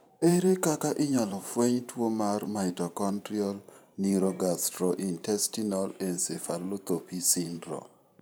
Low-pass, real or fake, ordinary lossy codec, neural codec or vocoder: none; fake; none; vocoder, 44.1 kHz, 128 mel bands every 512 samples, BigVGAN v2